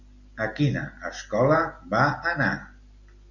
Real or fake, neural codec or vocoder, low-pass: real; none; 7.2 kHz